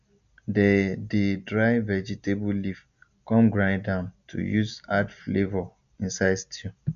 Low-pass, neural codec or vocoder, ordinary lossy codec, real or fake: 7.2 kHz; none; none; real